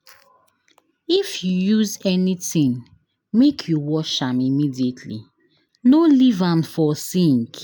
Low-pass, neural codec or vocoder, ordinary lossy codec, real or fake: none; none; none; real